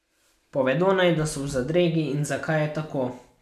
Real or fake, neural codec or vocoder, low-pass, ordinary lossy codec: real; none; 14.4 kHz; MP3, 96 kbps